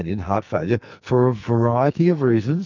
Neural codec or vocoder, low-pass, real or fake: codec, 44.1 kHz, 2.6 kbps, SNAC; 7.2 kHz; fake